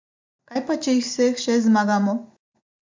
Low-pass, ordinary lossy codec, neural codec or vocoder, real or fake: 7.2 kHz; MP3, 64 kbps; none; real